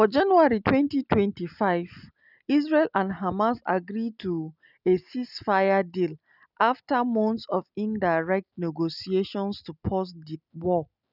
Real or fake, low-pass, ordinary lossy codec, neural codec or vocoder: real; 5.4 kHz; none; none